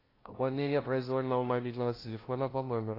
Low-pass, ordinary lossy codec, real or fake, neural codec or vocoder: 5.4 kHz; AAC, 24 kbps; fake; codec, 16 kHz, 0.5 kbps, FunCodec, trained on LibriTTS, 25 frames a second